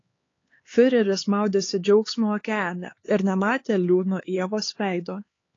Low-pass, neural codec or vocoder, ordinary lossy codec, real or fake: 7.2 kHz; codec, 16 kHz, 4 kbps, X-Codec, HuBERT features, trained on LibriSpeech; AAC, 32 kbps; fake